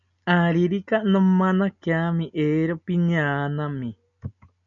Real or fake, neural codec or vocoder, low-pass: real; none; 7.2 kHz